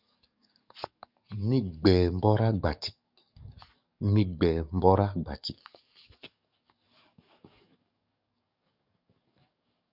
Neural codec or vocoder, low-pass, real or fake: codec, 44.1 kHz, 7.8 kbps, DAC; 5.4 kHz; fake